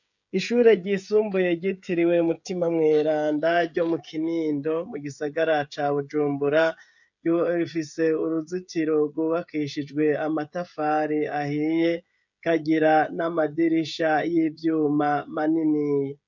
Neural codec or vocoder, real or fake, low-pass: codec, 16 kHz, 16 kbps, FreqCodec, smaller model; fake; 7.2 kHz